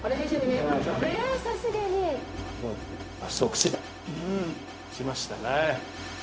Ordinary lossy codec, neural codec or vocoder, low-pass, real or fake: none; codec, 16 kHz, 0.4 kbps, LongCat-Audio-Codec; none; fake